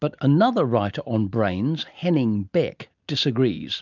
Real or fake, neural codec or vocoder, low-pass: real; none; 7.2 kHz